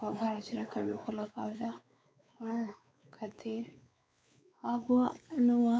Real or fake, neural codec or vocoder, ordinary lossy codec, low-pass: fake; codec, 16 kHz, 4 kbps, X-Codec, WavLM features, trained on Multilingual LibriSpeech; none; none